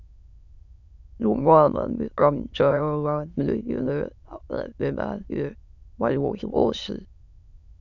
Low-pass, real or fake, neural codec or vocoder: 7.2 kHz; fake; autoencoder, 22.05 kHz, a latent of 192 numbers a frame, VITS, trained on many speakers